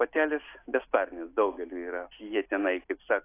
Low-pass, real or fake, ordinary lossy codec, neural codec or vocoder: 3.6 kHz; real; AAC, 24 kbps; none